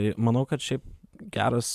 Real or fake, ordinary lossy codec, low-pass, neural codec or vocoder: real; MP3, 96 kbps; 14.4 kHz; none